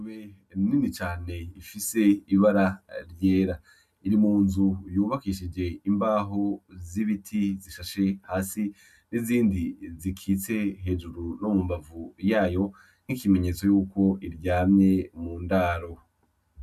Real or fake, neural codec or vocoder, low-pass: real; none; 14.4 kHz